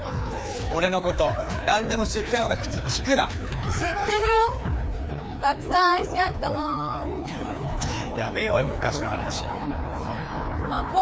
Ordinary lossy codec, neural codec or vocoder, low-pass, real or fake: none; codec, 16 kHz, 2 kbps, FreqCodec, larger model; none; fake